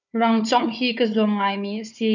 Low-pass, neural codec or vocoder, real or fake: 7.2 kHz; codec, 16 kHz, 4 kbps, FunCodec, trained on Chinese and English, 50 frames a second; fake